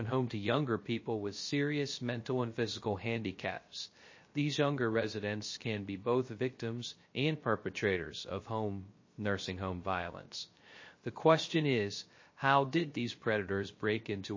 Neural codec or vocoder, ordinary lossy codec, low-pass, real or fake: codec, 16 kHz, 0.3 kbps, FocalCodec; MP3, 32 kbps; 7.2 kHz; fake